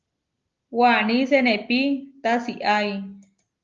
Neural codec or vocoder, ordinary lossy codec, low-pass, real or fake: none; Opus, 32 kbps; 7.2 kHz; real